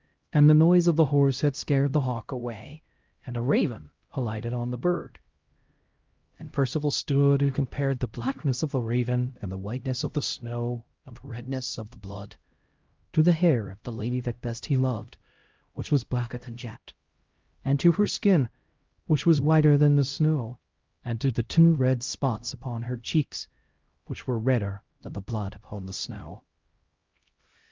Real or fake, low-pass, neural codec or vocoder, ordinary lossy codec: fake; 7.2 kHz; codec, 16 kHz, 0.5 kbps, X-Codec, HuBERT features, trained on LibriSpeech; Opus, 32 kbps